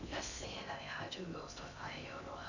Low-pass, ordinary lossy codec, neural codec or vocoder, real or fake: 7.2 kHz; none; codec, 16 kHz in and 24 kHz out, 0.6 kbps, FocalCodec, streaming, 4096 codes; fake